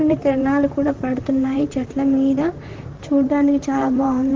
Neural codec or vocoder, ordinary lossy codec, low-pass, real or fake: vocoder, 44.1 kHz, 128 mel bands, Pupu-Vocoder; Opus, 16 kbps; 7.2 kHz; fake